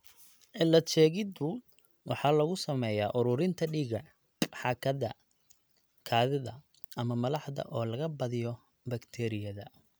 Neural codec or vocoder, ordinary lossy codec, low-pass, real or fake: none; none; none; real